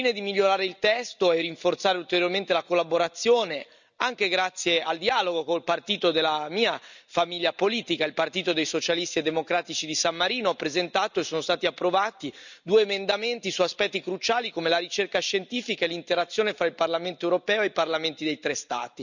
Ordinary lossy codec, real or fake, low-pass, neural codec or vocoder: none; real; 7.2 kHz; none